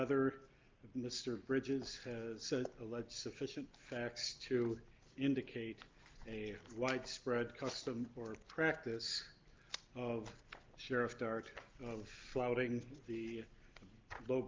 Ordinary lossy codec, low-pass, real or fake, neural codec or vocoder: Opus, 24 kbps; 7.2 kHz; real; none